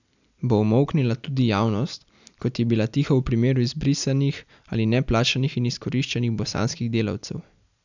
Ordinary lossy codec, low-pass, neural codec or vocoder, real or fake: none; 7.2 kHz; none; real